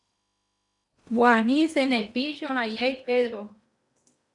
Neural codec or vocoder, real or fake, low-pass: codec, 16 kHz in and 24 kHz out, 0.8 kbps, FocalCodec, streaming, 65536 codes; fake; 10.8 kHz